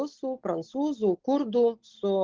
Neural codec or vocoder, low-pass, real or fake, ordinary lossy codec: none; 7.2 kHz; real; Opus, 16 kbps